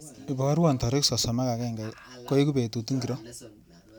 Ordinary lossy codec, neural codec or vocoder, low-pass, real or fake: none; none; none; real